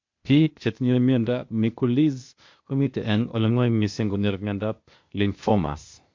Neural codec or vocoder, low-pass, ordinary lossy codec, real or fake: codec, 16 kHz, 0.8 kbps, ZipCodec; 7.2 kHz; MP3, 48 kbps; fake